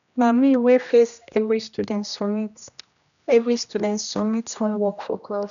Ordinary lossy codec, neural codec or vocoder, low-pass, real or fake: none; codec, 16 kHz, 1 kbps, X-Codec, HuBERT features, trained on general audio; 7.2 kHz; fake